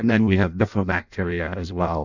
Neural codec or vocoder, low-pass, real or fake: codec, 16 kHz in and 24 kHz out, 0.6 kbps, FireRedTTS-2 codec; 7.2 kHz; fake